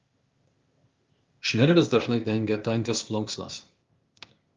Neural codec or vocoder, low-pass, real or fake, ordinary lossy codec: codec, 16 kHz, 0.8 kbps, ZipCodec; 7.2 kHz; fake; Opus, 32 kbps